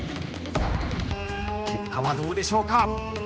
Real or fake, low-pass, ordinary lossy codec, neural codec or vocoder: fake; none; none; codec, 16 kHz, 2 kbps, X-Codec, HuBERT features, trained on general audio